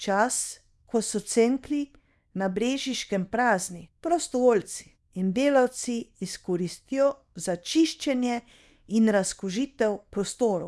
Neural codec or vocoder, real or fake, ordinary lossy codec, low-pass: codec, 24 kHz, 0.9 kbps, WavTokenizer, small release; fake; none; none